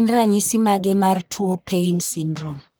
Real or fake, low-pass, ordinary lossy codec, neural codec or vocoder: fake; none; none; codec, 44.1 kHz, 1.7 kbps, Pupu-Codec